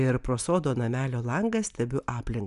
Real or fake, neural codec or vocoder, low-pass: real; none; 10.8 kHz